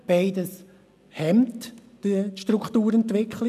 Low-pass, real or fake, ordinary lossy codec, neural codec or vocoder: 14.4 kHz; real; none; none